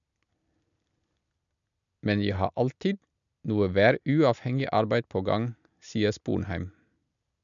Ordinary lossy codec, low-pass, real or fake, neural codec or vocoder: none; 7.2 kHz; real; none